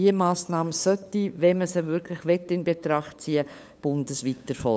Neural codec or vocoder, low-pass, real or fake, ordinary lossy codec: codec, 16 kHz, 8 kbps, FunCodec, trained on LibriTTS, 25 frames a second; none; fake; none